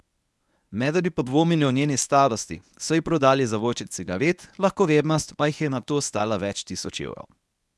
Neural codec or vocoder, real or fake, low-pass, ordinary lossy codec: codec, 24 kHz, 0.9 kbps, WavTokenizer, medium speech release version 1; fake; none; none